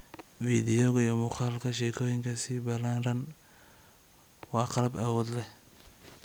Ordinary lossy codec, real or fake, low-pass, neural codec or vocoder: none; real; none; none